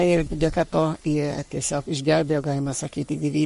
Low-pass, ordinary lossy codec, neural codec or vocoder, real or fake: 14.4 kHz; MP3, 48 kbps; codec, 44.1 kHz, 3.4 kbps, Pupu-Codec; fake